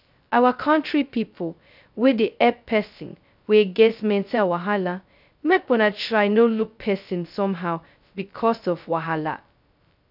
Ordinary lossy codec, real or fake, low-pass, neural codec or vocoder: none; fake; 5.4 kHz; codec, 16 kHz, 0.2 kbps, FocalCodec